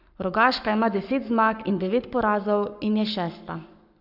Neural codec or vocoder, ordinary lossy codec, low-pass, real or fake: codec, 44.1 kHz, 7.8 kbps, Pupu-Codec; none; 5.4 kHz; fake